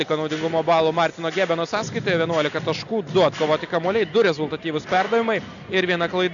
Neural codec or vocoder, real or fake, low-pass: none; real; 7.2 kHz